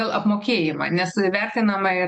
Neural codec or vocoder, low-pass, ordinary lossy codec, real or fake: none; 9.9 kHz; MP3, 48 kbps; real